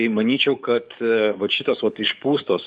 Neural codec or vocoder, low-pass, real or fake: codec, 44.1 kHz, 7.8 kbps, DAC; 10.8 kHz; fake